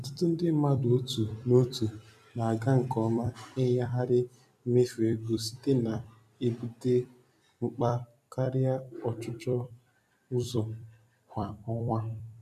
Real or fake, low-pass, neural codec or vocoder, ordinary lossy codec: fake; 14.4 kHz; vocoder, 44.1 kHz, 128 mel bands every 256 samples, BigVGAN v2; none